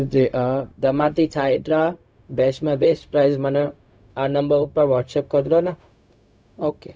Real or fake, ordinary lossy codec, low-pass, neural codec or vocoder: fake; none; none; codec, 16 kHz, 0.4 kbps, LongCat-Audio-Codec